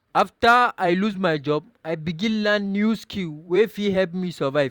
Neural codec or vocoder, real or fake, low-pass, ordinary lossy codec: vocoder, 44.1 kHz, 128 mel bands every 512 samples, BigVGAN v2; fake; 19.8 kHz; Opus, 64 kbps